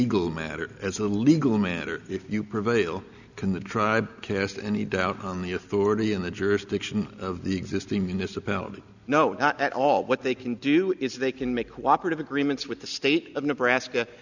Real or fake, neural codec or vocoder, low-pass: real; none; 7.2 kHz